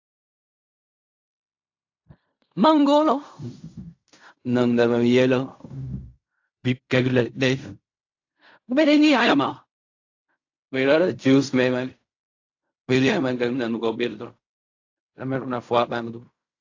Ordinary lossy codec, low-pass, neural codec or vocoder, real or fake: AAC, 48 kbps; 7.2 kHz; codec, 16 kHz in and 24 kHz out, 0.4 kbps, LongCat-Audio-Codec, fine tuned four codebook decoder; fake